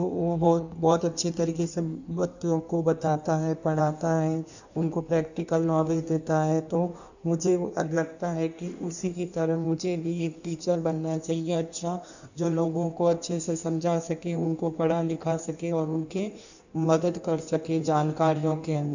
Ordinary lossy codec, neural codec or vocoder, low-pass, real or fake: none; codec, 16 kHz in and 24 kHz out, 1.1 kbps, FireRedTTS-2 codec; 7.2 kHz; fake